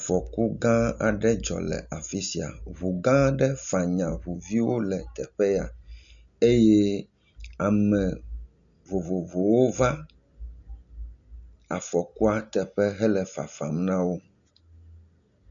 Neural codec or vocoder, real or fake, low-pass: none; real; 7.2 kHz